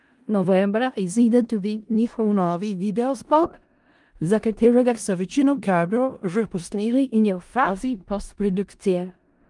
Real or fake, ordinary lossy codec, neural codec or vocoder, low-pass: fake; Opus, 32 kbps; codec, 16 kHz in and 24 kHz out, 0.4 kbps, LongCat-Audio-Codec, four codebook decoder; 10.8 kHz